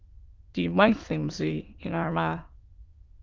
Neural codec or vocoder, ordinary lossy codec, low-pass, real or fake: autoencoder, 22.05 kHz, a latent of 192 numbers a frame, VITS, trained on many speakers; Opus, 24 kbps; 7.2 kHz; fake